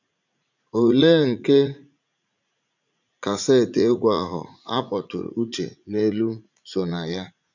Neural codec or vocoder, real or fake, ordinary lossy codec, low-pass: vocoder, 44.1 kHz, 80 mel bands, Vocos; fake; none; 7.2 kHz